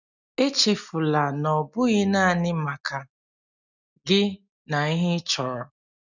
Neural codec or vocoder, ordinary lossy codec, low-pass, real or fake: none; none; 7.2 kHz; real